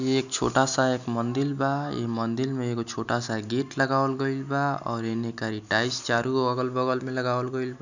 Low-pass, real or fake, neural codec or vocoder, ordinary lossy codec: 7.2 kHz; real; none; none